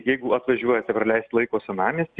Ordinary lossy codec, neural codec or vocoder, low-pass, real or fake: Opus, 64 kbps; none; 9.9 kHz; real